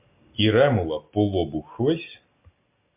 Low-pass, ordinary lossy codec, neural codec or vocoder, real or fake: 3.6 kHz; MP3, 32 kbps; none; real